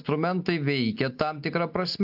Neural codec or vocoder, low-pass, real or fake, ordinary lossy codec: none; 5.4 kHz; real; AAC, 48 kbps